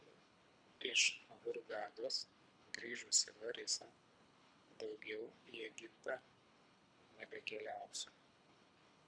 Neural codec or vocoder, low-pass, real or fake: codec, 24 kHz, 3 kbps, HILCodec; 9.9 kHz; fake